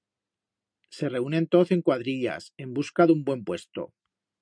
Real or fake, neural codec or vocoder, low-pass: real; none; 9.9 kHz